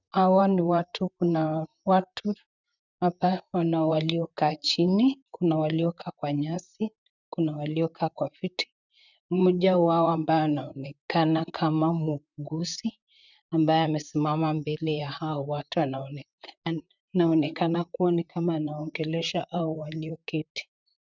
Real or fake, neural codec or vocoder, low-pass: fake; vocoder, 44.1 kHz, 128 mel bands, Pupu-Vocoder; 7.2 kHz